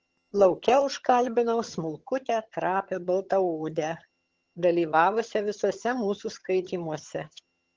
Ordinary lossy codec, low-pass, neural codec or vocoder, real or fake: Opus, 16 kbps; 7.2 kHz; vocoder, 22.05 kHz, 80 mel bands, HiFi-GAN; fake